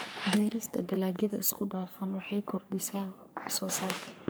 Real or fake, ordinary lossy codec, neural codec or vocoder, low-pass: fake; none; codec, 44.1 kHz, 3.4 kbps, Pupu-Codec; none